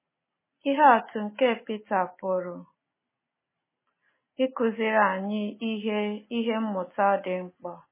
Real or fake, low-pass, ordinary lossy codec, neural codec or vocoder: real; 3.6 kHz; MP3, 16 kbps; none